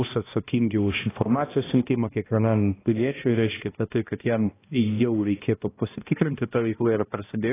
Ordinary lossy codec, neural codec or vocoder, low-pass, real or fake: AAC, 16 kbps; codec, 16 kHz, 1 kbps, X-Codec, HuBERT features, trained on balanced general audio; 3.6 kHz; fake